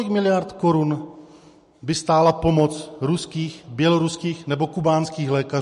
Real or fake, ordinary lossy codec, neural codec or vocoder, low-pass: real; MP3, 48 kbps; none; 14.4 kHz